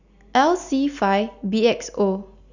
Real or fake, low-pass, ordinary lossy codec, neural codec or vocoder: real; 7.2 kHz; none; none